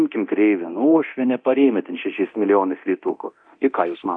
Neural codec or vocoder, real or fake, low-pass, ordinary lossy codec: codec, 24 kHz, 0.9 kbps, DualCodec; fake; 9.9 kHz; AAC, 64 kbps